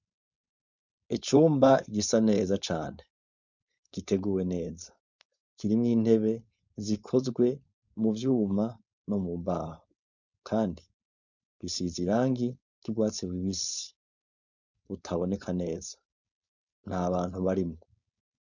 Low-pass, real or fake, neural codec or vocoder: 7.2 kHz; fake; codec, 16 kHz, 4.8 kbps, FACodec